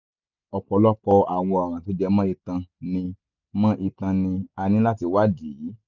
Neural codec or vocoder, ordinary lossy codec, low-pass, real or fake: none; none; 7.2 kHz; real